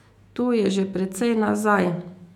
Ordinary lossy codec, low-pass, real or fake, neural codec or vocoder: none; 19.8 kHz; fake; autoencoder, 48 kHz, 128 numbers a frame, DAC-VAE, trained on Japanese speech